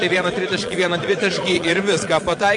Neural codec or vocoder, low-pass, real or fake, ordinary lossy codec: vocoder, 22.05 kHz, 80 mel bands, Vocos; 9.9 kHz; fake; MP3, 48 kbps